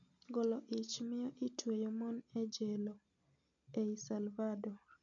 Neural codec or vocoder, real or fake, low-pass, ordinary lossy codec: none; real; 7.2 kHz; none